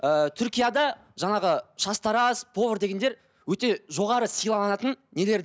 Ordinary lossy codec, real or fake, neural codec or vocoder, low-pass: none; real; none; none